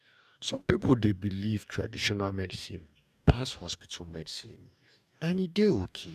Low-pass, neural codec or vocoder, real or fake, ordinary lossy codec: 14.4 kHz; codec, 44.1 kHz, 2.6 kbps, DAC; fake; none